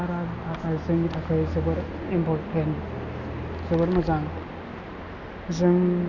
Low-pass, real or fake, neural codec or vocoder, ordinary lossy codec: 7.2 kHz; real; none; Opus, 64 kbps